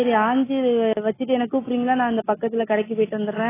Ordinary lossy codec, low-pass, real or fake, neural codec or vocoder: AAC, 16 kbps; 3.6 kHz; real; none